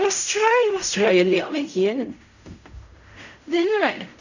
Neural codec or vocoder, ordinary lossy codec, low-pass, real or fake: codec, 16 kHz in and 24 kHz out, 0.4 kbps, LongCat-Audio-Codec, fine tuned four codebook decoder; none; 7.2 kHz; fake